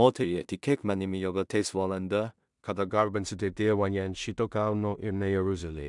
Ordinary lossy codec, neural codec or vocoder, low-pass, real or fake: MP3, 96 kbps; codec, 16 kHz in and 24 kHz out, 0.4 kbps, LongCat-Audio-Codec, two codebook decoder; 10.8 kHz; fake